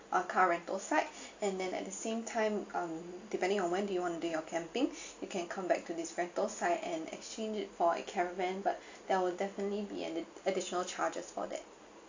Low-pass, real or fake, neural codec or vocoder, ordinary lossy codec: 7.2 kHz; real; none; AAC, 48 kbps